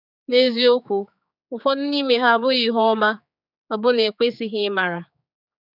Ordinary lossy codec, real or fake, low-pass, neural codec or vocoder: none; fake; 5.4 kHz; codec, 16 kHz, 4 kbps, X-Codec, HuBERT features, trained on general audio